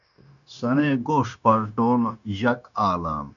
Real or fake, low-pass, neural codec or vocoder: fake; 7.2 kHz; codec, 16 kHz, 0.9 kbps, LongCat-Audio-Codec